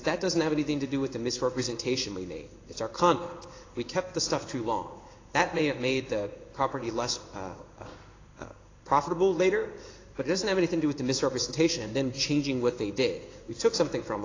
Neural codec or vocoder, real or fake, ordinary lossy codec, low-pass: codec, 16 kHz in and 24 kHz out, 1 kbps, XY-Tokenizer; fake; AAC, 32 kbps; 7.2 kHz